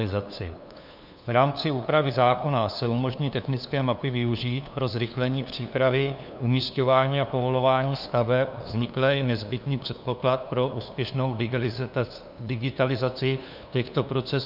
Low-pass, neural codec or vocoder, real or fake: 5.4 kHz; codec, 16 kHz, 2 kbps, FunCodec, trained on LibriTTS, 25 frames a second; fake